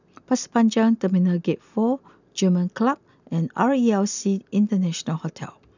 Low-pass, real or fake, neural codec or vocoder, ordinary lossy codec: 7.2 kHz; real; none; none